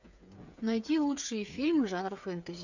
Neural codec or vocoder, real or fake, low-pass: codec, 16 kHz, 8 kbps, FreqCodec, smaller model; fake; 7.2 kHz